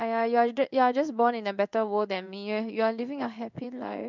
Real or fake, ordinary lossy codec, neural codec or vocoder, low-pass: fake; none; codec, 16 kHz in and 24 kHz out, 1 kbps, XY-Tokenizer; 7.2 kHz